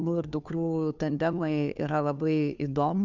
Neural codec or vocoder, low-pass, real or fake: codec, 16 kHz, 2 kbps, FunCodec, trained on Chinese and English, 25 frames a second; 7.2 kHz; fake